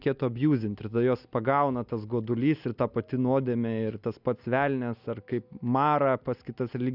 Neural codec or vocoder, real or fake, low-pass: none; real; 5.4 kHz